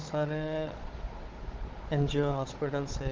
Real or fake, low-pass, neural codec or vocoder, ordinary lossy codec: fake; 7.2 kHz; codec, 16 kHz, 16 kbps, FunCodec, trained on Chinese and English, 50 frames a second; Opus, 16 kbps